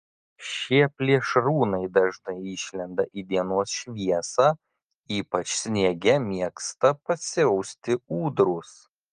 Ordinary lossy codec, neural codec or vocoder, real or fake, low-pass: Opus, 32 kbps; none; real; 9.9 kHz